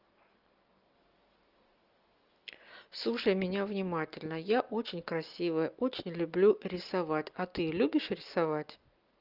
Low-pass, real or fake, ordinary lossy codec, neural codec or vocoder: 5.4 kHz; real; Opus, 24 kbps; none